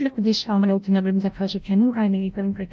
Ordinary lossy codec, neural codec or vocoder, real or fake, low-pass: none; codec, 16 kHz, 0.5 kbps, FreqCodec, larger model; fake; none